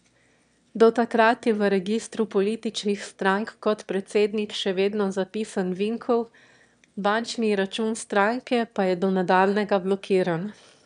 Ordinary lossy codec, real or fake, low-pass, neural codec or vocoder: none; fake; 9.9 kHz; autoencoder, 22.05 kHz, a latent of 192 numbers a frame, VITS, trained on one speaker